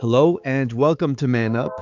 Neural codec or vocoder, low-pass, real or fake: codec, 16 kHz, 4 kbps, X-Codec, HuBERT features, trained on balanced general audio; 7.2 kHz; fake